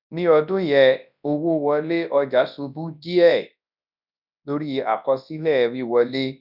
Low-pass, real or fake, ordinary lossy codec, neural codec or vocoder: 5.4 kHz; fake; none; codec, 24 kHz, 0.9 kbps, WavTokenizer, large speech release